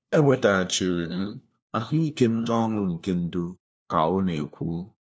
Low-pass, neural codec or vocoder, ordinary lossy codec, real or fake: none; codec, 16 kHz, 1 kbps, FunCodec, trained on LibriTTS, 50 frames a second; none; fake